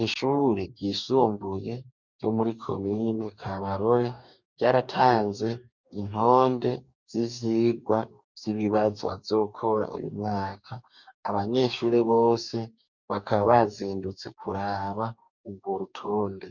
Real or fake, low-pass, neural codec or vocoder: fake; 7.2 kHz; codec, 44.1 kHz, 2.6 kbps, DAC